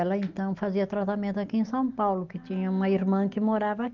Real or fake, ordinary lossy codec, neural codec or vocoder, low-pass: real; Opus, 24 kbps; none; 7.2 kHz